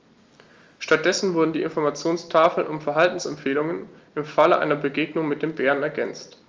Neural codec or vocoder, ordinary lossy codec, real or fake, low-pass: none; Opus, 32 kbps; real; 7.2 kHz